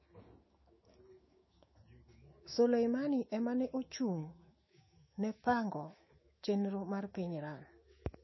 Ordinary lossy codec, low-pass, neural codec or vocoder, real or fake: MP3, 24 kbps; 7.2 kHz; autoencoder, 48 kHz, 128 numbers a frame, DAC-VAE, trained on Japanese speech; fake